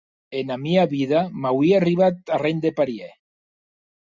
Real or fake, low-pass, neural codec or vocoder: real; 7.2 kHz; none